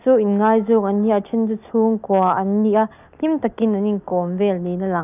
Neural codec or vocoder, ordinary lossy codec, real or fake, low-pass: none; none; real; 3.6 kHz